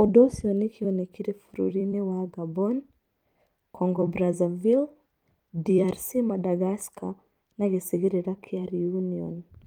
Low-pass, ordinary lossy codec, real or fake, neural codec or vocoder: 19.8 kHz; Opus, 32 kbps; fake; vocoder, 44.1 kHz, 128 mel bands every 256 samples, BigVGAN v2